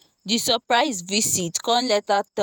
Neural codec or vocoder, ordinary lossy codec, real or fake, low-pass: vocoder, 48 kHz, 128 mel bands, Vocos; none; fake; none